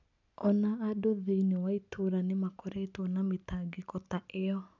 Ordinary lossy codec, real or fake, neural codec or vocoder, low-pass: none; real; none; 7.2 kHz